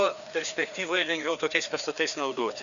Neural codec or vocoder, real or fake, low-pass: codec, 16 kHz, 2 kbps, FreqCodec, larger model; fake; 7.2 kHz